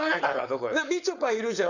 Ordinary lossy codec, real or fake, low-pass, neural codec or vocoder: none; fake; 7.2 kHz; codec, 16 kHz, 4.8 kbps, FACodec